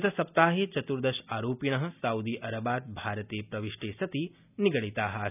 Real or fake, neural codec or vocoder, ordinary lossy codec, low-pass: real; none; none; 3.6 kHz